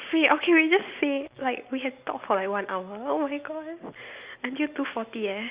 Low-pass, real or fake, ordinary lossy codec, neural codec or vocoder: 3.6 kHz; real; Opus, 64 kbps; none